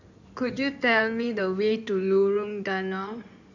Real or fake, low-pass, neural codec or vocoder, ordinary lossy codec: fake; 7.2 kHz; codec, 16 kHz in and 24 kHz out, 2.2 kbps, FireRedTTS-2 codec; none